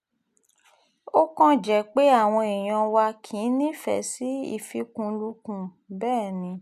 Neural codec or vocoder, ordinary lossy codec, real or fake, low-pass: none; none; real; 14.4 kHz